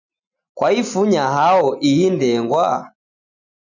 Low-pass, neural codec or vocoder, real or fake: 7.2 kHz; none; real